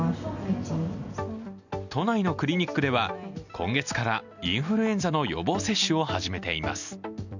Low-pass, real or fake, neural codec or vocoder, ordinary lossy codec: 7.2 kHz; real; none; none